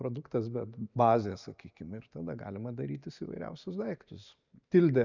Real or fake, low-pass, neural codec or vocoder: real; 7.2 kHz; none